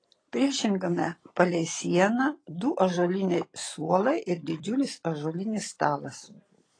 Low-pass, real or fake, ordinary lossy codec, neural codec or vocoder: 9.9 kHz; fake; AAC, 32 kbps; vocoder, 44.1 kHz, 128 mel bands every 256 samples, BigVGAN v2